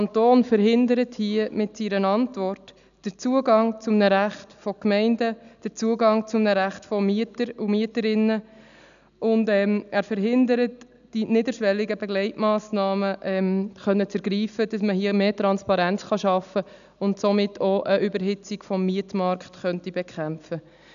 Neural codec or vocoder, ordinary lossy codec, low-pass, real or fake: none; none; 7.2 kHz; real